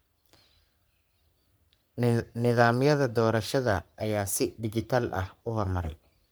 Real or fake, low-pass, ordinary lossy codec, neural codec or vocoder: fake; none; none; codec, 44.1 kHz, 3.4 kbps, Pupu-Codec